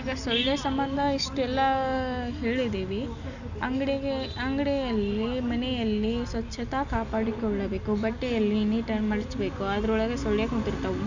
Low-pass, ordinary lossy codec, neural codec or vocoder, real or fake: 7.2 kHz; none; none; real